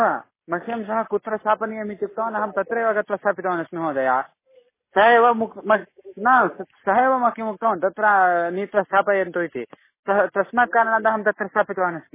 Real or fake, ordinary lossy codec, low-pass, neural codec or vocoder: real; MP3, 16 kbps; 3.6 kHz; none